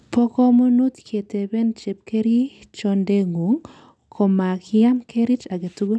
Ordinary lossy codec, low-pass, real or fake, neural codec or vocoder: none; none; real; none